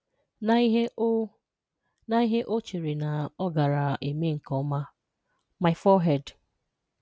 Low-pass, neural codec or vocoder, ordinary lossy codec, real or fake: none; none; none; real